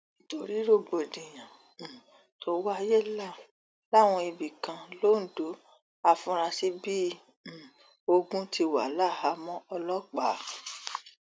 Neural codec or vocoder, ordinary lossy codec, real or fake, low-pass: none; none; real; none